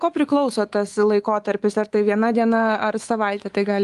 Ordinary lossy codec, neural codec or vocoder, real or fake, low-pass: Opus, 24 kbps; codec, 24 kHz, 3.1 kbps, DualCodec; fake; 10.8 kHz